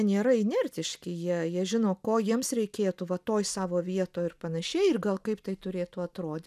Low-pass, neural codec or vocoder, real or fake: 14.4 kHz; none; real